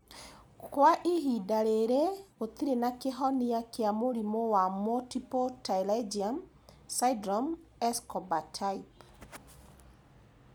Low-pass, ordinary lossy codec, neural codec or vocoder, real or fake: none; none; none; real